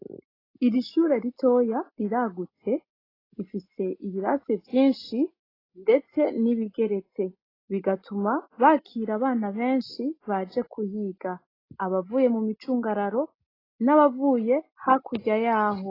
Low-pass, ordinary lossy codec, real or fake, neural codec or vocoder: 5.4 kHz; AAC, 24 kbps; real; none